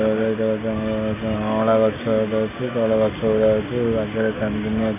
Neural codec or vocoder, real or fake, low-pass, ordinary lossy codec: none; real; 3.6 kHz; AAC, 24 kbps